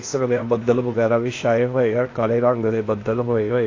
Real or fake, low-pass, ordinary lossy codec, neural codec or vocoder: fake; 7.2 kHz; AAC, 32 kbps; codec, 16 kHz, 0.8 kbps, ZipCodec